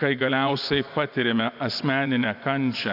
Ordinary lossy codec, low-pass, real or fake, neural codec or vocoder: AAC, 48 kbps; 5.4 kHz; fake; vocoder, 24 kHz, 100 mel bands, Vocos